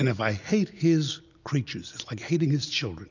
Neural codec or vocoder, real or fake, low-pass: none; real; 7.2 kHz